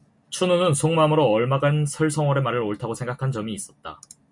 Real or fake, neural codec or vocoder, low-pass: real; none; 10.8 kHz